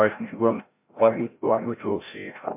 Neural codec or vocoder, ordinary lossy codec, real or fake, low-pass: codec, 16 kHz, 0.5 kbps, FreqCodec, larger model; MP3, 24 kbps; fake; 3.6 kHz